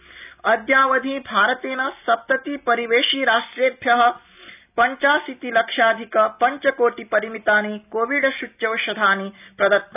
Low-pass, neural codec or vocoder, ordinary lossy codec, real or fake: 3.6 kHz; none; none; real